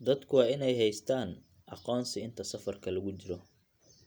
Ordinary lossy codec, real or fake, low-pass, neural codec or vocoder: none; real; none; none